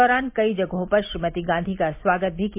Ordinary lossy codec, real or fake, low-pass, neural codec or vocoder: MP3, 32 kbps; real; 3.6 kHz; none